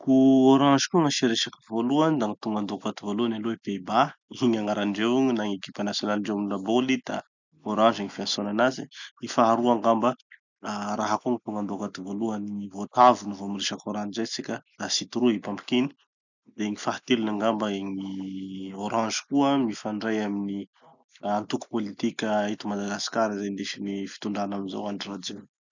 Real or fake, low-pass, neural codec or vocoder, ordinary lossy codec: real; 7.2 kHz; none; none